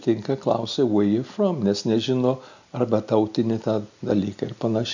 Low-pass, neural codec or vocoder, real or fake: 7.2 kHz; none; real